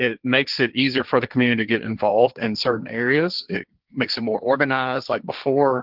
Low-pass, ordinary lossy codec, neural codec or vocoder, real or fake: 5.4 kHz; Opus, 24 kbps; codec, 16 kHz, 1.1 kbps, Voila-Tokenizer; fake